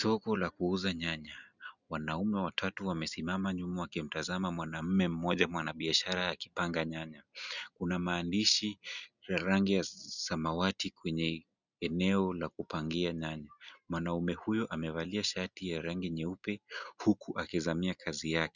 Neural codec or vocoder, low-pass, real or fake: none; 7.2 kHz; real